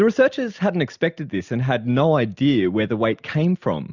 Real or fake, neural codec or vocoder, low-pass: real; none; 7.2 kHz